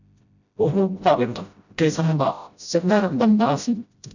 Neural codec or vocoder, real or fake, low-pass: codec, 16 kHz, 0.5 kbps, FreqCodec, smaller model; fake; 7.2 kHz